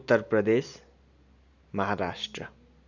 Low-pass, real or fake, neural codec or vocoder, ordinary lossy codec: 7.2 kHz; real; none; none